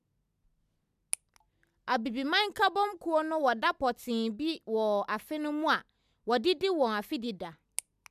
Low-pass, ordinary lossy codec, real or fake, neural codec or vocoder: 14.4 kHz; none; real; none